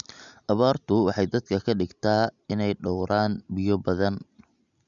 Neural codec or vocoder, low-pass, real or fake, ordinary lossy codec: none; 7.2 kHz; real; none